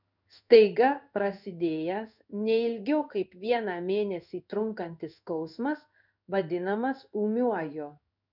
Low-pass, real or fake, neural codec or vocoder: 5.4 kHz; fake; codec, 16 kHz in and 24 kHz out, 1 kbps, XY-Tokenizer